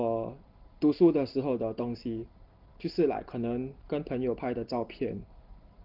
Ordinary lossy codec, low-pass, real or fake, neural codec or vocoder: Opus, 16 kbps; 5.4 kHz; real; none